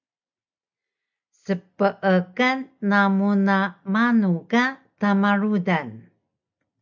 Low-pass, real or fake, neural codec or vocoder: 7.2 kHz; real; none